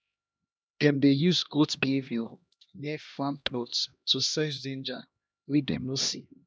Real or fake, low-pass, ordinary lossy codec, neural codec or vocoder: fake; none; none; codec, 16 kHz, 1 kbps, X-Codec, HuBERT features, trained on LibriSpeech